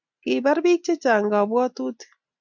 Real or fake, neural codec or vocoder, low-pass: real; none; 7.2 kHz